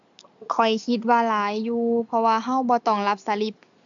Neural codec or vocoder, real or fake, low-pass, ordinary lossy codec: none; real; 7.2 kHz; AAC, 64 kbps